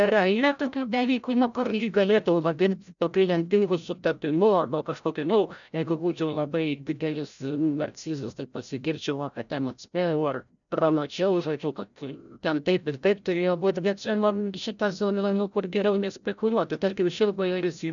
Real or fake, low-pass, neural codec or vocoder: fake; 7.2 kHz; codec, 16 kHz, 0.5 kbps, FreqCodec, larger model